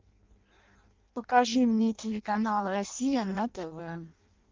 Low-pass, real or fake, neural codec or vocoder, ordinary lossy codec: 7.2 kHz; fake; codec, 16 kHz in and 24 kHz out, 0.6 kbps, FireRedTTS-2 codec; Opus, 32 kbps